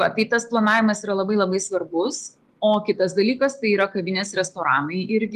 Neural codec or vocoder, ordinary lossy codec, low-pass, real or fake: none; Opus, 24 kbps; 14.4 kHz; real